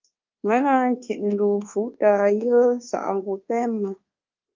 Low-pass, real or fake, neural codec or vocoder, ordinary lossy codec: 7.2 kHz; fake; codec, 24 kHz, 1.2 kbps, DualCodec; Opus, 32 kbps